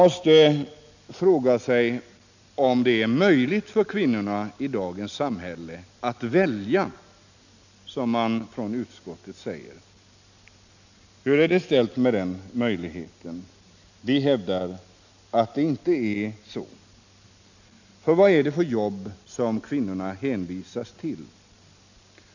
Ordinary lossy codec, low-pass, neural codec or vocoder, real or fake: none; 7.2 kHz; none; real